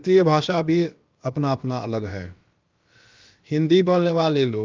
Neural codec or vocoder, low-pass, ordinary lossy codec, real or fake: codec, 16 kHz, about 1 kbps, DyCAST, with the encoder's durations; 7.2 kHz; Opus, 24 kbps; fake